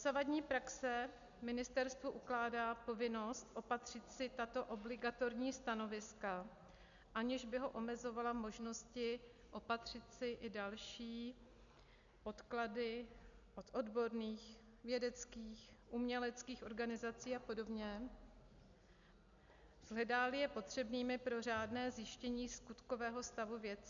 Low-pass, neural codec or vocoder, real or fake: 7.2 kHz; none; real